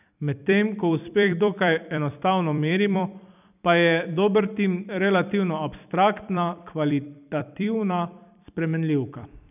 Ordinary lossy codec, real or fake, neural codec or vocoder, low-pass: none; fake; vocoder, 44.1 kHz, 128 mel bands every 256 samples, BigVGAN v2; 3.6 kHz